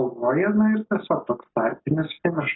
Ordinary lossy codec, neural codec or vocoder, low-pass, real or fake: AAC, 16 kbps; none; 7.2 kHz; real